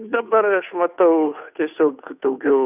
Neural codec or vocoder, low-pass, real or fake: codec, 16 kHz, 2 kbps, FunCodec, trained on Chinese and English, 25 frames a second; 3.6 kHz; fake